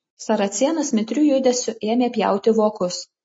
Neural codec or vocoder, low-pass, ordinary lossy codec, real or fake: none; 9.9 kHz; MP3, 32 kbps; real